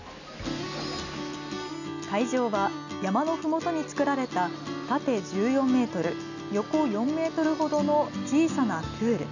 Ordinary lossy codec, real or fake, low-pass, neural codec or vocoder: none; real; 7.2 kHz; none